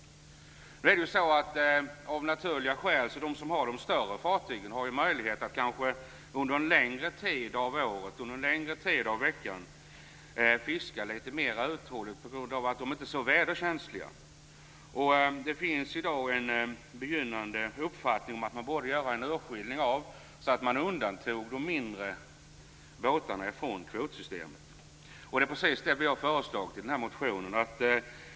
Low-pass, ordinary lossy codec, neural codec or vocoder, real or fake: none; none; none; real